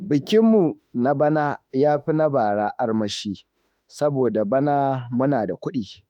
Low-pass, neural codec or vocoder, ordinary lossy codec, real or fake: 19.8 kHz; autoencoder, 48 kHz, 32 numbers a frame, DAC-VAE, trained on Japanese speech; none; fake